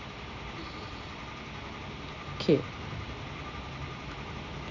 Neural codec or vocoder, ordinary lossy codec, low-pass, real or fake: none; none; 7.2 kHz; real